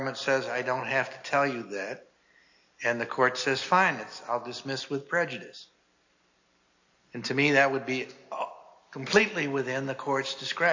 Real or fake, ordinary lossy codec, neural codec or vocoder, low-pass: real; AAC, 48 kbps; none; 7.2 kHz